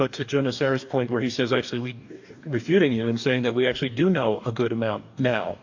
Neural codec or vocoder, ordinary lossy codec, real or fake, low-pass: codec, 44.1 kHz, 2.6 kbps, DAC; AAC, 48 kbps; fake; 7.2 kHz